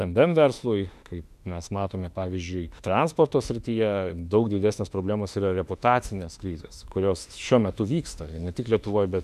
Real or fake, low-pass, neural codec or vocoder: fake; 14.4 kHz; autoencoder, 48 kHz, 32 numbers a frame, DAC-VAE, trained on Japanese speech